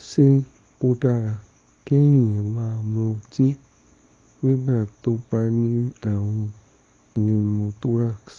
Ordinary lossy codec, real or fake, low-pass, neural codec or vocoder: AAC, 48 kbps; fake; 10.8 kHz; codec, 24 kHz, 0.9 kbps, WavTokenizer, small release